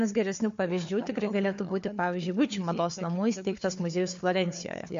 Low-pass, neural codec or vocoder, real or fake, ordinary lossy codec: 7.2 kHz; codec, 16 kHz, 4 kbps, FunCodec, trained on Chinese and English, 50 frames a second; fake; MP3, 48 kbps